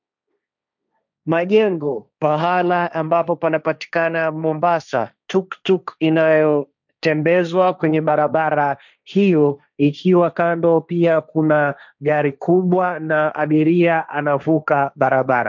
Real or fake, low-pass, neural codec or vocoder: fake; 7.2 kHz; codec, 16 kHz, 1.1 kbps, Voila-Tokenizer